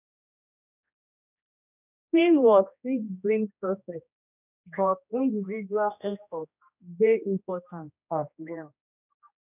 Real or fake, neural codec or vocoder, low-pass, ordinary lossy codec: fake; codec, 16 kHz, 1 kbps, X-Codec, HuBERT features, trained on general audio; 3.6 kHz; none